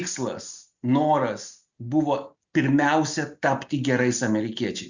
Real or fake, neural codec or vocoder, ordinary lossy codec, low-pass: real; none; Opus, 64 kbps; 7.2 kHz